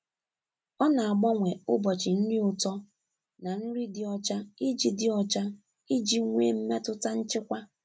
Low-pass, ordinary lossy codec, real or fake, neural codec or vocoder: none; none; real; none